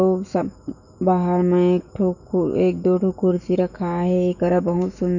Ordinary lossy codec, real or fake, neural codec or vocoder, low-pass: none; fake; autoencoder, 48 kHz, 128 numbers a frame, DAC-VAE, trained on Japanese speech; 7.2 kHz